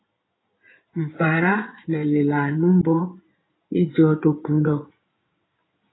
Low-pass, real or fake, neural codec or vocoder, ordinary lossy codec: 7.2 kHz; fake; codec, 16 kHz in and 24 kHz out, 2.2 kbps, FireRedTTS-2 codec; AAC, 16 kbps